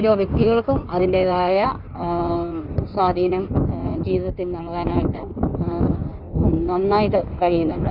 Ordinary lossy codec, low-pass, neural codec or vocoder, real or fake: none; 5.4 kHz; codec, 16 kHz in and 24 kHz out, 1.1 kbps, FireRedTTS-2 codec; fake